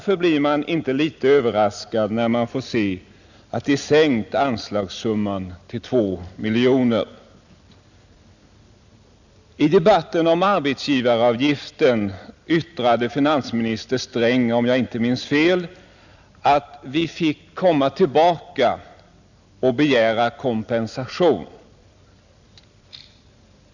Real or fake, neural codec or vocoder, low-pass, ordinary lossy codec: real; none; 7.2 kHz; none